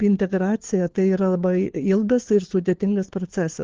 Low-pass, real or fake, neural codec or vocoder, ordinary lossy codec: 7.2 kHz; fake; codec, 16 kHz, 4 kbps, FunCodec, trained on LibriTTS, 50 frames a second; Opus, 16 kbps